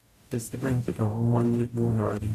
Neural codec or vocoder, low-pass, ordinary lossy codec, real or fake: codec, 44.1 kHz, 0.9 kbps, DAC; 14.4 kHz; AAC, 64 kbps; fake